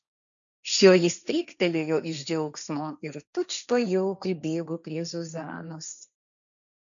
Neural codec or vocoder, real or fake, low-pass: codec, 16 kHz, 1.1 kbps, Voila-Tokenizer; fake; 7.2 kHz